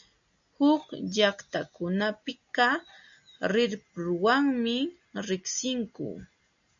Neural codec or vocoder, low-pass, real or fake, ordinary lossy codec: none; 7.2 kHz; real; AAC, 64 kbps